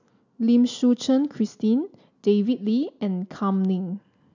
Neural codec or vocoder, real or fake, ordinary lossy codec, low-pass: none; real; none; 7.2 kHz